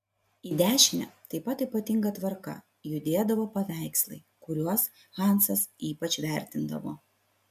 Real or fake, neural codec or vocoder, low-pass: real; none; 14.4 kHz